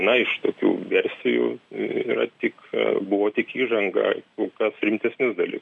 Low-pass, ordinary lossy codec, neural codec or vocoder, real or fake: 10.8 kHz; MP3, 64 kbps; none; real